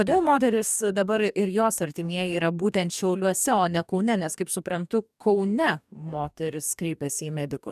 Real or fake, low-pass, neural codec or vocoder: fake; 14.4 kHz; codec, 44.1 kHz, 2.6 kbps, DAC